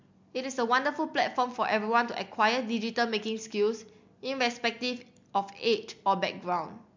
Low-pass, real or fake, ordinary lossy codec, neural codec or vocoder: 7.2 kHz; real; MP3, 64 kbps; none